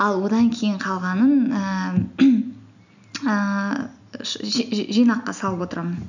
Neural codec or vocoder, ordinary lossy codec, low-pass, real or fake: none; none; 7.2 kHz; real